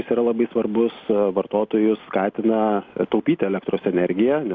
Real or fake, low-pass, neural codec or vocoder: real; 7.2 kHz; none